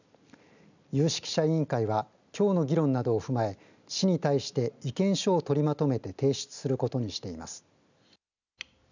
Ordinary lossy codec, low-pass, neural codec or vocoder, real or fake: none; 7.2 kHz; none; real